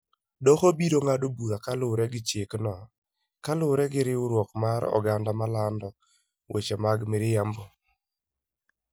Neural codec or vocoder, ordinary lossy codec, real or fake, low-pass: none; none; real; none